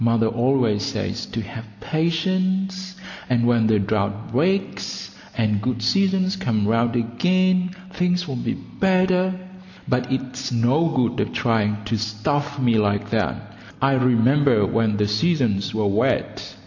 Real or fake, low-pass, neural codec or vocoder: real; 7.2 kHz; none